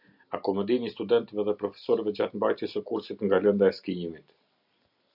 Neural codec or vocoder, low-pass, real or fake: none; 5.4 kHz; real